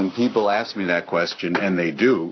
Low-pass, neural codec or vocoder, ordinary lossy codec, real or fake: 7.2 kHz; none; AAC, 48 kbps; real